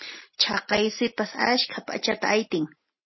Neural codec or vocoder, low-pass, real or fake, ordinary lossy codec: none; 7.2 kHz; real; MP3, 24 kbps